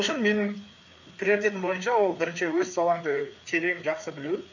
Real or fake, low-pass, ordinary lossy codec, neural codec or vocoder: fake; 7.2 kHz; none; codec, 16 kHz, 4 kbps, FreqCodec, larger model